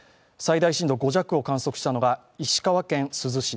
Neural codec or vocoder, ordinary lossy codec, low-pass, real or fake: none; none; none; real